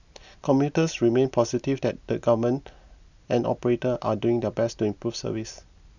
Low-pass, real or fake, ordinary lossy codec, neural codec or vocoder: 7.2 kHz; real; none; none